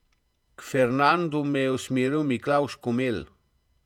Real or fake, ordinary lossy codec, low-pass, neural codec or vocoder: fake; none; 19.8 kHz; vocoder, 48 kHz, 128 mel bands, Vocos